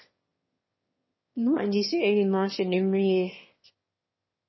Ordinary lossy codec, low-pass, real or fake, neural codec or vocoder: MP3, 24 kbps; 7.2 kHz; fake; autoencoder, 22.05 kHz, a latent of 192 numbers a frame, VITS, trained on one speaker